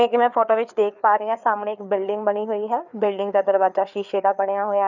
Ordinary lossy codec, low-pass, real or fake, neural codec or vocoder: none; 7.2 kHz; fake; codec, 16 kHz, 4 kbps, FreqCodec, larger model